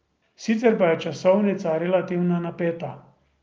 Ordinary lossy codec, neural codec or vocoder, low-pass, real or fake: Opus, 24 kbps; none; 7.2 kHz; real